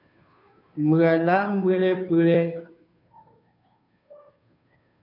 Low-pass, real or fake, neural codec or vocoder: 5.4 kHz; fake; codec, 16 kHz, 2 kbps, FunCodec, trained on Chinese and English, 25 frames a second